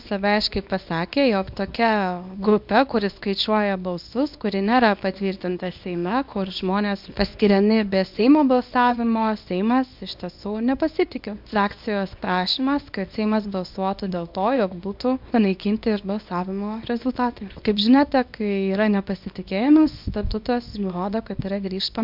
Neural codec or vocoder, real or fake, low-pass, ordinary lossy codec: codec, 24 kHz, 0.9 kbps, WavTokenizer, small release; fake; 5.4 kHz; MP3, 48 kbps